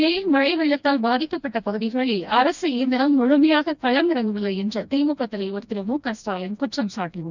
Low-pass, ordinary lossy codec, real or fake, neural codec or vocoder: 7.2 kHz; none; fake; codec, 16 kHz, 1 kbps, FreqCodec, smaller model